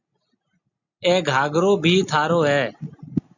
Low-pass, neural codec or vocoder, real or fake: 7.2 kHz; none; real